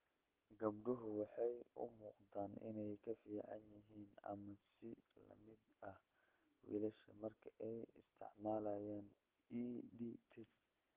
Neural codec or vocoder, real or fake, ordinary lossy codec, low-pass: none; real; Opus, 16 kbps; 3.6 kHz